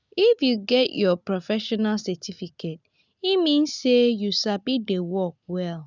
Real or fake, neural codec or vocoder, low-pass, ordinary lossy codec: real; none; 7.2 kHz; none